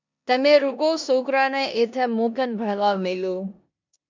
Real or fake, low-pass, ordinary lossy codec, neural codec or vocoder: fake; 7.2 kHz; MP3, 64 kbps; codec, 16 kHz in and 24 kHz out, 0.9 kbps, LongCat-Audio-Codec, four codebook decoder